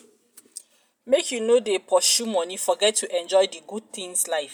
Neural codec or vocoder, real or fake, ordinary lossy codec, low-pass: none; real; none; none